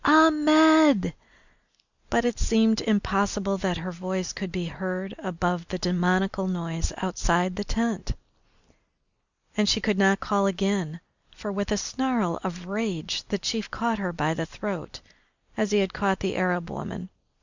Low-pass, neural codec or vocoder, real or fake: 7.2 kHz; none; real